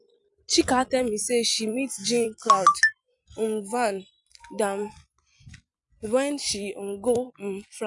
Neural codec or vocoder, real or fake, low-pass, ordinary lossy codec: vocoder, 44.1 kHz, 128 mel bands every 256 samples, BigVGAN v2; fake; 10.8 kHz; none